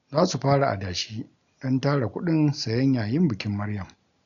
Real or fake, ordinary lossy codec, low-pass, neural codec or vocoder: real; none; 7.2 kHz; none